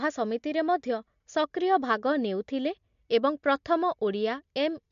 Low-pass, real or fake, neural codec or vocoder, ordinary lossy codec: 7.2 kHz; real; none; MP3, 48 kbps